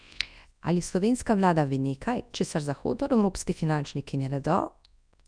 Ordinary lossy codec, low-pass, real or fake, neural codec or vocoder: none; 9.9 kHz; fake; codec, 24 kHz, 0.9 kbps, WavTokenizer, large speech release